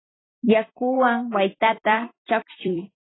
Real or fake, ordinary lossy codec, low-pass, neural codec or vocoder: fake; AAC, 16 kbps; 7.2 kHz; vocoder, 44.1 kHz, 128 mel bands every 256 samples, BigVGAN v2